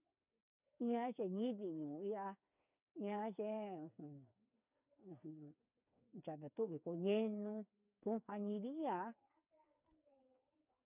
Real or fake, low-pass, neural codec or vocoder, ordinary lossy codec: fake; 3.6 kHz; codec, 16 kHz, 4 kbps, FreqCodec, smaller model; none